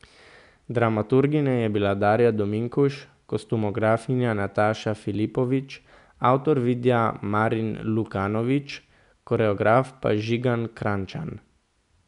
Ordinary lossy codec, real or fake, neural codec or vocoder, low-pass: none; real; none; 10.8 kHz